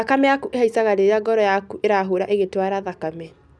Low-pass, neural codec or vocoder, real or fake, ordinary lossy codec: none; none; real; none